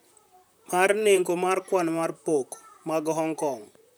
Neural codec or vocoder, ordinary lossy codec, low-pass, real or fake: vocoder, 44.1 kHz, 128 mel bands, Pupu-Vocoder; none; none; fake